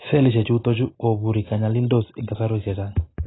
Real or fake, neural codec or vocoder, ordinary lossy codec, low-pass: real; none; AAC, 16 kbps; 7.2 kHz